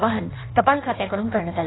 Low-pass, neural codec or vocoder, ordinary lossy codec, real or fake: 7.2 kHz; codec, 16 kHz in and 24 kHz out, 1.1 kbps, FireRedTTS-2 codec; AAC, 16 kbps; fake